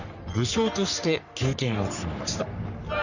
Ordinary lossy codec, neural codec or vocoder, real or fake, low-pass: none; codec, 44.1 kHz, 3.4 kbps, Pupu-Codec; fake; 7.2 kHz